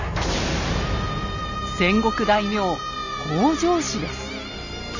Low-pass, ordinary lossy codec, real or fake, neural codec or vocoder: 7.2 kHz; none; real; none